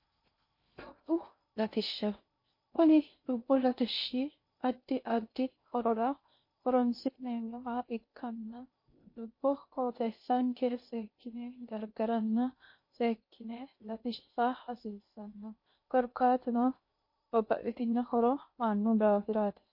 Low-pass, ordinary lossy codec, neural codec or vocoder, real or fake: 5.4 kHz; MP3, 32 kbps; codec, 16 kHz in and 24 kHz out, 0.6 kbps, FocalCodec, streaming, 2048 codes; fake